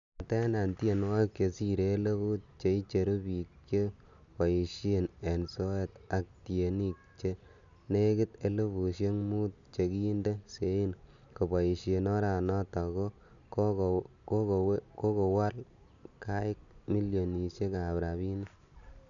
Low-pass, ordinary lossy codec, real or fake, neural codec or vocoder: 7.2 kHz; none; real; none